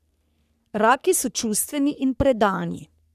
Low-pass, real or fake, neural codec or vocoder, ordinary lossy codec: 14.4 kHz; fake; codec, 44.1 kHz, 3.4 kbps, Pupu-Codec; none